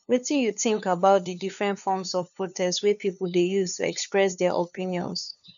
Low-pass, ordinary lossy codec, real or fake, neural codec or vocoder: 7.2 kHz; none; fake; codec, 16 kHz, 2 kbps, FunCodec, trained on LibriTTS, 25 frames a second